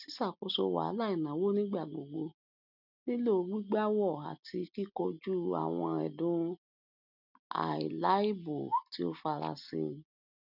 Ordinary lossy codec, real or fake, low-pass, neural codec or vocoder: none; real; 5.4 kHz; none